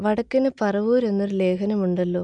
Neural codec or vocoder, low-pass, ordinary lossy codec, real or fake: none; 9.9 kHz; none; real